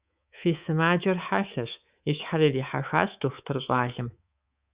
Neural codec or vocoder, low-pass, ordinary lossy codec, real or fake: codec, 24 kHz, 3.1 kbps, DualCodec; 3.6 kHz; Opus, 64 kbps; fake